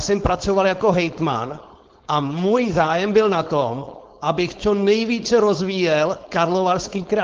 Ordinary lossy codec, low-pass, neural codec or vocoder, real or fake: Opus, 16 kbps; 7.2 kHz; codec, 16 kHz, 4.8 kbps, FACodec; fake